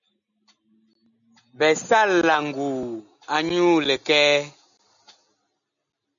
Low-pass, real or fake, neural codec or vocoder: 7.2 kHz; real; none